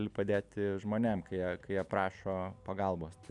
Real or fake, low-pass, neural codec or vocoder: real; 10.8 kHz; none